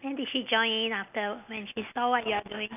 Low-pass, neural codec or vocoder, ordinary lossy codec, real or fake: 3.6 kHz; none; none; real